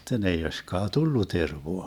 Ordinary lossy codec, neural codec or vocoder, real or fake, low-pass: none; vocoder, 44.1 kHz, 128 mel bands every 512 samples, BigVGAN v2; fake; 19.8 kHz